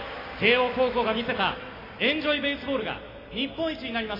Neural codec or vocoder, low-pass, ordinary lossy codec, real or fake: none; 5.4 kHz; AAC, 24 kbps; real